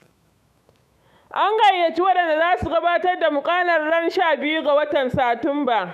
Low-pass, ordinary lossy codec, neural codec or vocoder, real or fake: 14.4 kHz; none; autoencoder, 48 kHz, 128 numbers a frame, DAC-VAE, trained on Japanese speech; fake